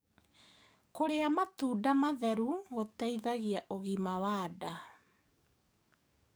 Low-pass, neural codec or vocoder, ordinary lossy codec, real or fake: none; codec, 44.1 kHz, 7.8 kbps, DAC; none; fake